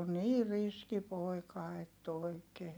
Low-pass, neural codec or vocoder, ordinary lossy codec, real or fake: none; none; none; real